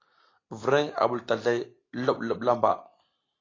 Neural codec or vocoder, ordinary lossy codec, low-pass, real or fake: none; AAC, 32 kbps; 7.2 kHz; real